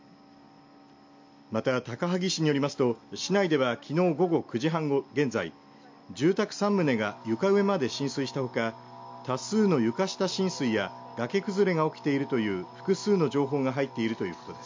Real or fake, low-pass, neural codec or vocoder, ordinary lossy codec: real; 7.2 kHz; none; MP3, 48 kbps